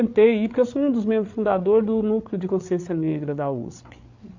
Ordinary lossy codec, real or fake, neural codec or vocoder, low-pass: AAC, 48 kbps; fake; codec, 16 kHz, 8 kbps, FreqCodec, larger model; 7.2 kHz